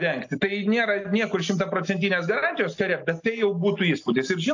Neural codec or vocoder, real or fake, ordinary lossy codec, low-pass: none; real; AAC, 48 kbps; 7.2 kHz